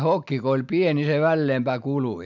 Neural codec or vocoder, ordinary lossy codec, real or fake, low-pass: none; none; real; 7.2 kHz